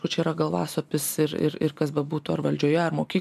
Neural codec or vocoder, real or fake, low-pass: none; real; 14.4 kHz